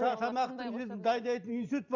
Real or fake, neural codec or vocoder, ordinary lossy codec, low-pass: real; none; none; 7.2 kHz